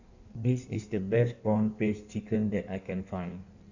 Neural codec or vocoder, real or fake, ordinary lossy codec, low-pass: codec, 16 kHz in and 24 kHz out, 1.1 kbps, FireRedTTS-2 codec; fake; none; 7.2 kHz